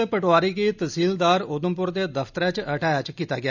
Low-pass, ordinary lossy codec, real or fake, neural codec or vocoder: 7.2 kHz; none; real; none